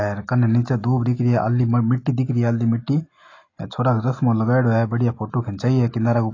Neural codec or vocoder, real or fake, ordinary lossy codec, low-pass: none; real; AAC, 32 kbps; 7.2 kHz